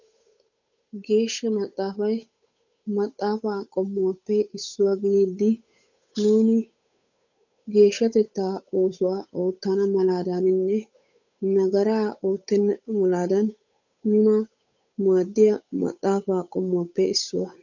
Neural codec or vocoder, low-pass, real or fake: codec, 16 kHz, 8 kbps, FunCodec, trained on Chinese and English, 25 frames a second; 7.2 kHz; fake